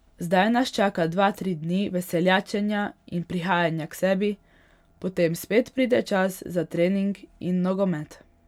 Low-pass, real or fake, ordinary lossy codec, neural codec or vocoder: 19.8 kHz; real; none; none